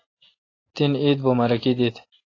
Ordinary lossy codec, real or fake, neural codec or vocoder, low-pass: AAC, 32 kbps; real; none; 7.2 kHz